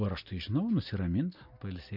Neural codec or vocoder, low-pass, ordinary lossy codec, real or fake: none; 5.4 kHz; MP3, 48 kbps; real